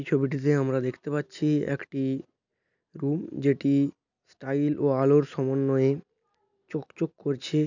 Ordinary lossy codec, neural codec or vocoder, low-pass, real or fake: none; none; 7.2 kHz; real